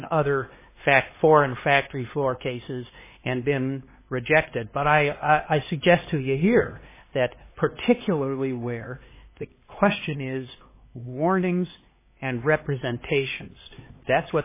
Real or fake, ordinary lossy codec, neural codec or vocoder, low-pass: fake; MP3, 16 kbps; codec, 16 kHz, 2 kbps, X-Codec, HuBERT features, trained on LibriSpeech; 3.6 kHz